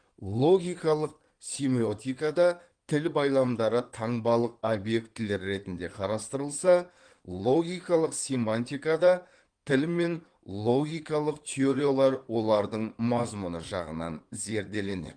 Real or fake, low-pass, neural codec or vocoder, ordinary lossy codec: fake; 9.9 kHz; codec, 16 kHz in and 24 kHz out, 2.2 kbps, FireRedTTS-2 codec; Opus, 24 kbps